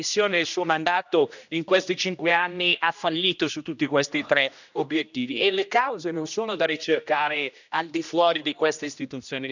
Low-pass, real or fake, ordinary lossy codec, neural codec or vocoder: 7.2 kHz; fake; none; codec, 16 kHz, 1 kbps, X-Codec, HuBERT features, trained on general audio